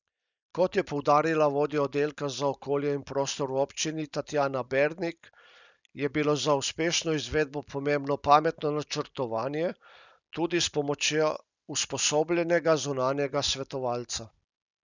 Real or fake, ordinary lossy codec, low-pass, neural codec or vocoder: real; none; 7.2 kHz; none